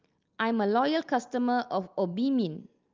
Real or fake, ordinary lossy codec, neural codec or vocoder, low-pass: real; Opus, 24 kbps; none; 7.2 kHz